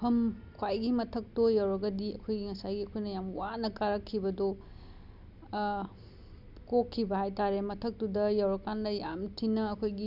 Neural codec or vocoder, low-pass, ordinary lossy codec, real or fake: none; 5.4 kHz; Opus, 64 kbps; real